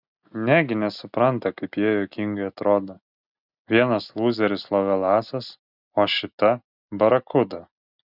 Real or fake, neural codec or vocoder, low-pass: real; none; 5.4 kHz